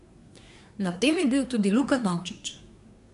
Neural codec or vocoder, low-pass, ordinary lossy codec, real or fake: codec, 24 kHz, 1 kbps, SNAC; 10.8 kHz; none; fake